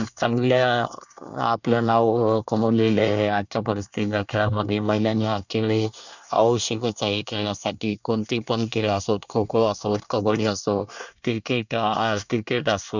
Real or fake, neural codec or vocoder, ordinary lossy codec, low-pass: fake; codec, 24 kHz, 1 kbps, SNAC; none; 7.2 kHz